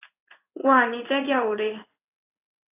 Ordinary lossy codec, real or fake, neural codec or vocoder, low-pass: AAC, 32 kbps; fake; codec, 16 kHz in and 24 kHz out, 1 kbps, XY-Tokenizer; 3.6 kHz